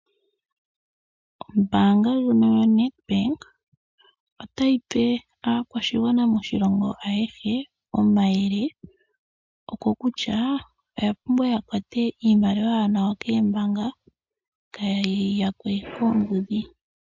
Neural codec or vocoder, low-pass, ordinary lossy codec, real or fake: none; 7.2 kHz; MP3, 64 kbps; real